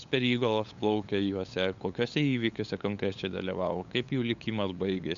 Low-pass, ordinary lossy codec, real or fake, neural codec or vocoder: 7.2 kHz; MP3, 64 kbps; fake; codec, 16 kHz, 8 kbps, FunCodec, trained on LibriTTS, 25 frames a second